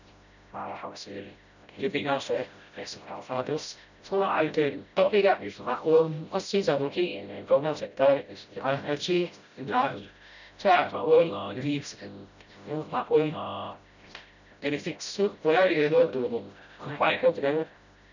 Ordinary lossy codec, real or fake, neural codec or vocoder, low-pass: none; fake; codec, 16 kHz, 0.5 kbps, FreqCodec, smaller model; 7.2 kHz